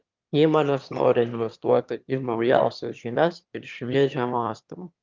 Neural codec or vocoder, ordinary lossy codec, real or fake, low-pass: autoencoder, 22.05 kHz, a latent of 192 numbers a frame, VITS, trained on one speaker; Opus, 32 kbps; fake; 7.2 kHz